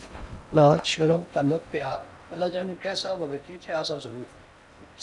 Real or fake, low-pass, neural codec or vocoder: fake; 10.8 kHz; codec, 16 kHz in and 24 kHz out, 0.6 kbps, FocalCodec, streaming, 4096 codes